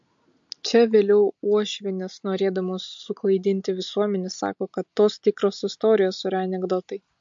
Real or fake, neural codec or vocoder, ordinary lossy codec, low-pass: real; none; MP3, 48 kbps; 7.2 kHz